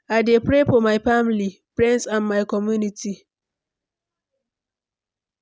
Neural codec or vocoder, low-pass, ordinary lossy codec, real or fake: none; none; none; real